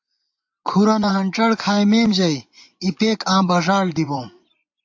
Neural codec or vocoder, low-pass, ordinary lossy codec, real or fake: vocoder, 24 kHz, 100 mel bands, Vocos; 7.2 kHz; AAC, 48 kbps; fake